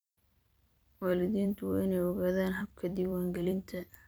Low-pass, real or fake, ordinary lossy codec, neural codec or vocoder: none; fake; none; vocoder, 44.1 kHz, 128 mel bands every 256 samples, BigVGAN v2